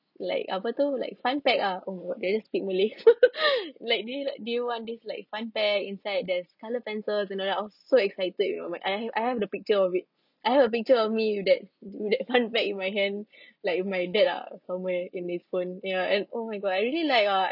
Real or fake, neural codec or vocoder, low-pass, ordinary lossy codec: real; none; 5.4 kHz; none